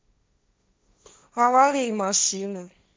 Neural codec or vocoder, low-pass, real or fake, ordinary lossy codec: codec, 16 kHz, 1.1 kbps, Voila-Tokenizer; none; fake; none